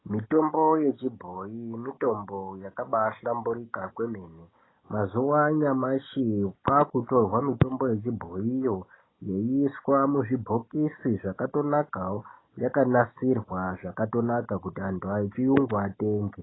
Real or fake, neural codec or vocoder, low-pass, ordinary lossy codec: real; none; 7.2 kHz; AAC, 16 kbps